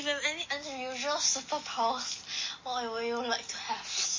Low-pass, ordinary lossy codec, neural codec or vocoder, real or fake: 7.2 kHz; MP3, 32 kbps; none; real